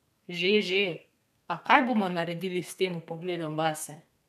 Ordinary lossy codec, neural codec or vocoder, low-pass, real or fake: none; codec, 32 kHz, 1.9 kbps, SNAC; 14.4 kHz; fake